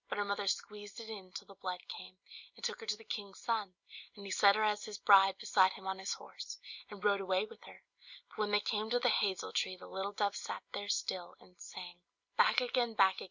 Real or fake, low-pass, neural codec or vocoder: real; 7.2 kHz; none